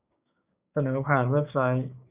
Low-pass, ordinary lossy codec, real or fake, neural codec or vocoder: 3.6 kHz; Opus, 64 kbps; fake; codec, 16 kHz, 6 kbps, DAC